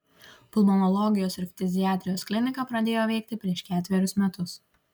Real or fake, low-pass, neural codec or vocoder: real; 19.8 kHz; none